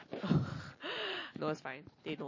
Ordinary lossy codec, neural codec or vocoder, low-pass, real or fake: MP3, 32 kbps; none; 7.2 kHz; real